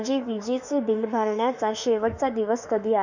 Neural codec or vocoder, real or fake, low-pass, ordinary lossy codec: autoencoder, 48 kHz, 32 numbers a frame, DAC-VAE, trained on Japanese speech; fake; 7.2 kHz; none